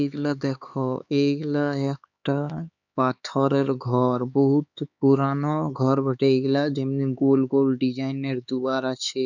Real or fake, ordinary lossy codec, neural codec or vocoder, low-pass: fake; none; codec, 16 kHz, 4 kbps, X-Codec, HuBERT features, trained on LibriSpeech; 7.2 kHz